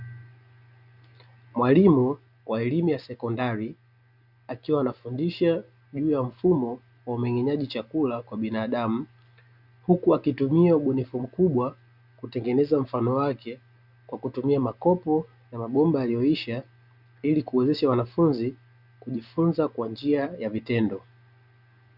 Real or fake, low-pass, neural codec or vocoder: real; 5.4 kHz; none